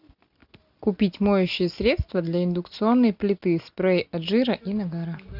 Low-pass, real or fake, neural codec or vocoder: 5.4 kHz; real; none